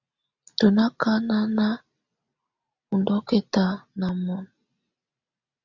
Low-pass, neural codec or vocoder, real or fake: 7.2 kHz; none; real